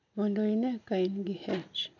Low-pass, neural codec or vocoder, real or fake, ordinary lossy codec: 7.2 kHz; none; real; none